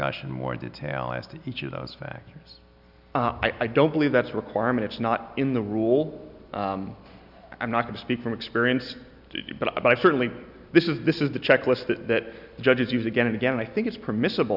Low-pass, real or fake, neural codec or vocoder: 5.4 kHz; real; none